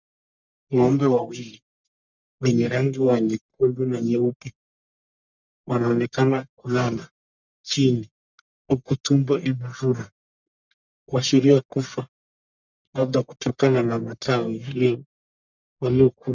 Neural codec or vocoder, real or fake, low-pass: codec, 44.1 kHz, 1.7 kbps, Pupu-Codec; fake; 7.2 kHz